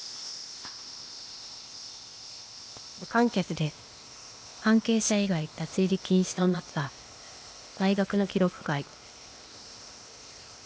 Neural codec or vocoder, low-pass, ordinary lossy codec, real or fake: codec, 16 kHz, 0.8 kbps, ZipCodec; none; none; fake